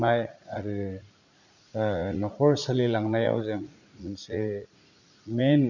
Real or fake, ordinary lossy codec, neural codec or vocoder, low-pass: fake; none; vocoder, 44.1 kHz, 80 mel bands, Vocos; 7.2 kHz